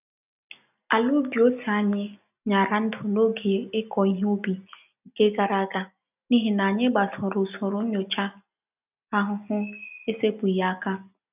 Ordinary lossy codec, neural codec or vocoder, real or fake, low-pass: none; none; real; 3.6 kHz